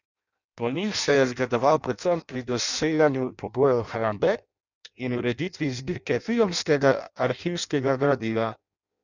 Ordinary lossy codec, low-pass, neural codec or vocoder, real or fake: none; 7.2 kHz; codec, 16 kHz in and 24 kHz out, 0.6 kbps, FireRedTTS-2 codec; fake